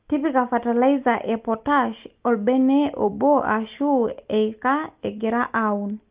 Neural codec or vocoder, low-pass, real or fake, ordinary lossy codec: none; 3.6 kHz; real; Opus, 32 kbps